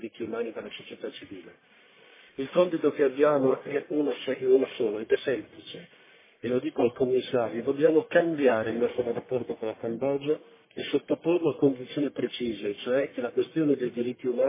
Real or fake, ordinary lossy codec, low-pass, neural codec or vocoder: fake; MP3, 16 kbps; 3.6 kHz; codec, 44.1 kHz, 1.7 kbps, Pupu-Codec